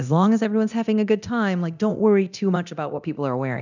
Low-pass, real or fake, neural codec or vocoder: 7.2 kHz; fake; codec, 24 kHz, 0.9 kbps, DualCodec